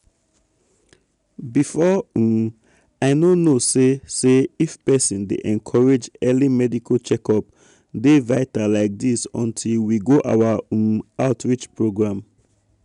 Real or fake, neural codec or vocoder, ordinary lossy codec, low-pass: real; none; none; 10.8 kHz